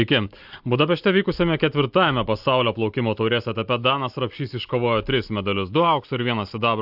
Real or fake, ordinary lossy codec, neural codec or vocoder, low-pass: real; AAC, 48 kbps; none; 5.4 kHz